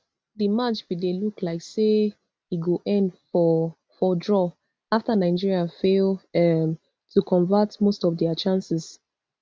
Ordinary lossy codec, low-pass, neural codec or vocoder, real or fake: none; none; none; real